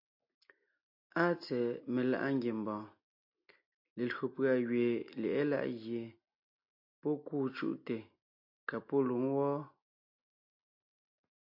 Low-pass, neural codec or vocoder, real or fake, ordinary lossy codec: 5.4 kHz; none; real; AAC, 48 kbps